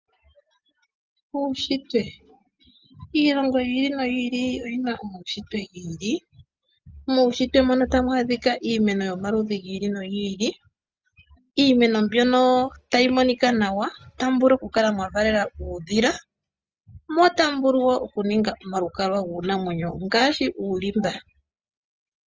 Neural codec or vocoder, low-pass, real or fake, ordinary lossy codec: none; 7.2 kHz; real; Opus, 24 kbps